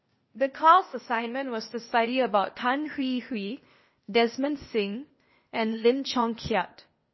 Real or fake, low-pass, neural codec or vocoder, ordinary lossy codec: fake; 7.2 kHz; codec, 16 kHz, 0.8 kbps, ZipCodec; MP3, 24 kbps